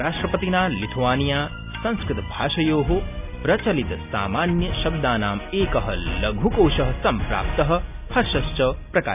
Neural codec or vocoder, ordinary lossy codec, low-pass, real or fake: none; none; 3.6 kHz; real